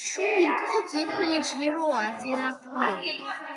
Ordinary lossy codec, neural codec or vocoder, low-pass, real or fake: MP3, 96 kbps; codec, 32 kHz, 1.9 kbps, SNAC; 10.8 kHz; fake